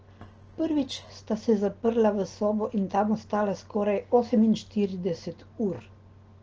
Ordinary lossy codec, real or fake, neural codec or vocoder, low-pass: Opus, 16 kbps; real; none; 7.2 kHz